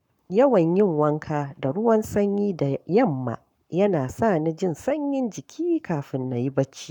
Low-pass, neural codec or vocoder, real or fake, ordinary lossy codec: 19.8 kHz; codec, 44.1 kHz, 7.8 kbps, Pupu-Codec; fake; none